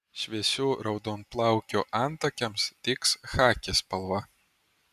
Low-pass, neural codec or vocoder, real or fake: 14.4 kHz; none; real